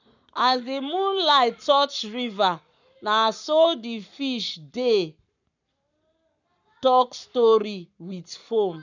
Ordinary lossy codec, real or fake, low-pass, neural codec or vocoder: none; fake; 7.2 kHz; codec, 44.1 kHz, 7.8 kbps, Pupu-Codec